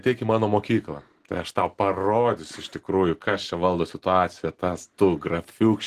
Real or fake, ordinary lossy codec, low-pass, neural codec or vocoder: real; Opus, 16 kbps; 14.4 kHz; none